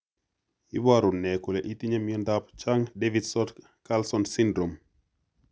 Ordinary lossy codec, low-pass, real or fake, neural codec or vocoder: none; none; real; none